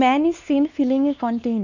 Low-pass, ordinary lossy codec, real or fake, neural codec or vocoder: 7.2 kHz; none; fake; codec, 16 kHz, 2 kbps, X-Codec, WavLM features, trained on Multilingual LibriSpeech